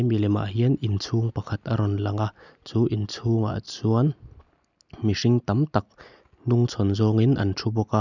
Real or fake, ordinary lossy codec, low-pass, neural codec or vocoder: real; none; 7.2 kHz; none